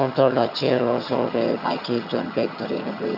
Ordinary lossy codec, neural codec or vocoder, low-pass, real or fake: none; vocoder, 22.05 kHz, 80 mel bands, HiFi-GAN; 5.4 kHz; fake